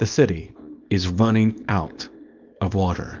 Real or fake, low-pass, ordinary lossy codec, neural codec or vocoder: fake; 7.2 kHz; Opus, 24 kbps; codec, 24 kHz, 0.9 kbps, WavTokenizer, small release